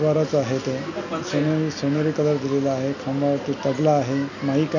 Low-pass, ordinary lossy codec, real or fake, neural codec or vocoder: 7.2 kHz; none; real; none